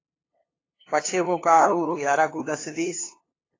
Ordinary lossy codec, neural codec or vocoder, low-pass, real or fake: AAC, 32 kbps; codec, 16 kHz, 2 kbps, FunCodec, trained on LibriTTS, 25 frames a second; 7.2 kHz; fake